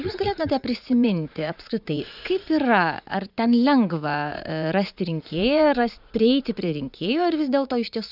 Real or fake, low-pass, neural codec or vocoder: fake; 5.4 kHz; vocoder, 44.1 kHz, 80 mel bands, Vocos